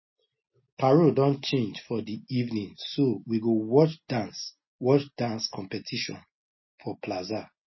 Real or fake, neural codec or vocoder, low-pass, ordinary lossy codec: real; none; 7.2 kHz; MP3, 24 kbps